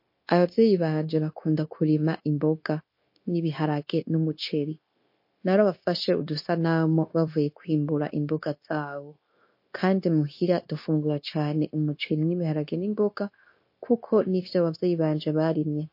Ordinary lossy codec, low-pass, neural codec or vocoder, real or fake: MP3, 32 kbps; 5.4 kHz; codec, 16 kHz, 0.9 kbps, LongCat-Audio-Codec; fake